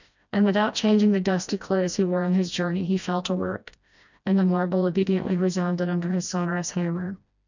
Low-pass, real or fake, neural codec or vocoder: 7.2 kHz; fake; codec, 16 kHz, 1 kbps, FreqCodec, smaller model